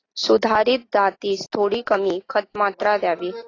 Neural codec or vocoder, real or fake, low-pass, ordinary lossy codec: none; real; 7.2 kHz; AAC, 32 kbps